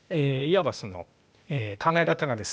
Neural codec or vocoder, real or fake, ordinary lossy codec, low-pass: codec, 16 kHz, 0.8 kbps, ZipCodec; fake; none; none